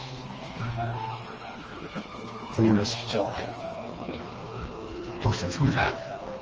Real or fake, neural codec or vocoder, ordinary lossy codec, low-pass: fake; codec, 16 kHz, 2 kbps, FreqCodec, smaller model; Opus, 24 kbps; 7.2 kHz